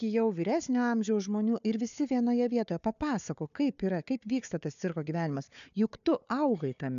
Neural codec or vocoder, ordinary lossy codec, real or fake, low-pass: codec, 16 kHz, 4 kbps, FunCodec, trained on Chinese and English, 50 frames a second; AAC, 96 kbps; fake; 7.2 kHz